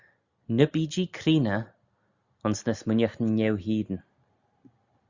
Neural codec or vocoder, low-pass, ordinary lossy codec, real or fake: none; 7.2 kHz; Opus, 64 kbps; real